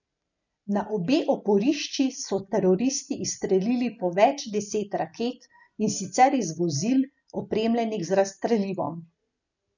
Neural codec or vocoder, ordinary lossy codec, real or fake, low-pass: none; none; real; 7.2 kHz